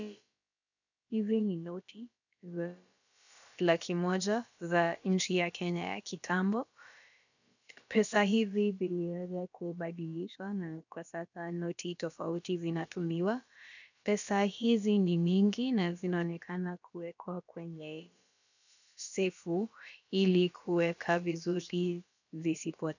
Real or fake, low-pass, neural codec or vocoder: fake; 7.2 kHz; codec, 16 kHz, about 1 kbps, DyCAST, with the encoder's durations